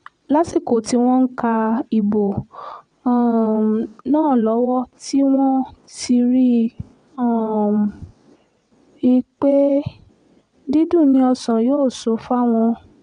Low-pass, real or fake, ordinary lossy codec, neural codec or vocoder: 9.9 kHz; fake; MP3, 96 kbps; vocoder, 22.05 kHz, 80 mel bands, WaveNeXt